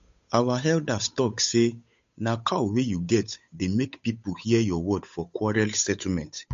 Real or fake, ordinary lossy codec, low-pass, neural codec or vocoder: fake; MP3, 48 kbps; 7.2 kHz; codec, 16 kHz, 8 kbps, FunCodec, trained on Chinese and English, 25 frames a second